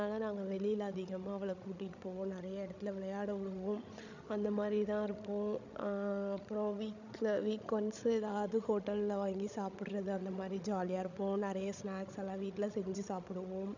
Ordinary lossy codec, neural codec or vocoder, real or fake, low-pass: none; codec, 16 kHz, 16 kbps, FreqCodec, larger model; fake; 7.2 kHz